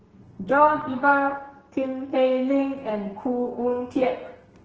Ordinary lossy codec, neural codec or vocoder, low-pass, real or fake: Opus, 16 kbps; codec, 32 kHz, 1.9 kbps, SNAC; 7.2 kHz; fake